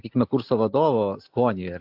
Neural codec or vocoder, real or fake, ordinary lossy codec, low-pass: none; real; AAC, 48 kbps; 5.4 kHz